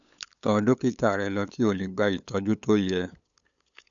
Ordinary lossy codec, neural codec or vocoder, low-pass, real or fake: none; codec, 16 kHz, 8 kbps, FunCodec, trained on LibriTTS, 25 frames a second; 7.2 kHz; fake